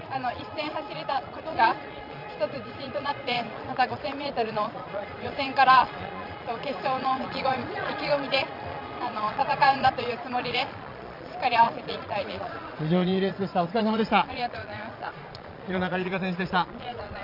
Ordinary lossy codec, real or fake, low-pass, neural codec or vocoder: none; fake; 5.4 kHz; vocoder, 22.05 kHz, 80 mel bands, Vocos